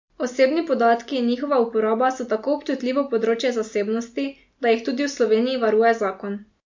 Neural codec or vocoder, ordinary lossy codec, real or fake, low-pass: none; MP3, 48 kbps; real; 7.2 kHz